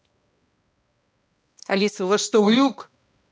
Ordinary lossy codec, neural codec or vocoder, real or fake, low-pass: none; codec, 16 kHz, 1 kbps, X-Codec, HuBERT features, trained on balanced general audio; fake; none